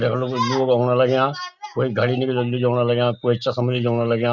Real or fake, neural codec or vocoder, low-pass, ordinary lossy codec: real; none; 7.2 kHz; none